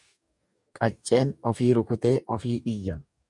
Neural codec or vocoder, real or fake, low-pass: codec, 44.1 kHz, 2.6 kbps, DAC; fake; 10.8 kHz